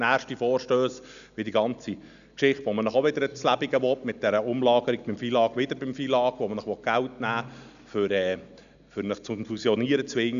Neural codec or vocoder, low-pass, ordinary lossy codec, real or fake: none; 7.2 kHz; none; real